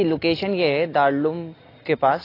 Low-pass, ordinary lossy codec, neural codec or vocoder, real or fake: 5.4 kHz; AAC, 24 kbps; none; real